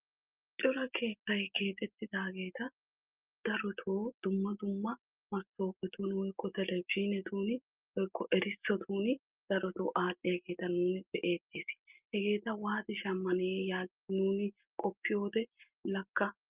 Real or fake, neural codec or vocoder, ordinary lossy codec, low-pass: real; none; Opus, 24 kbps; 3.6 kHz